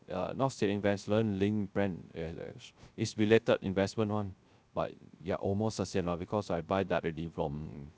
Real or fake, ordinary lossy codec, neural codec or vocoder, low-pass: fake; none; codec, 16 kHz, 0.3 kbps, FocalCodec; none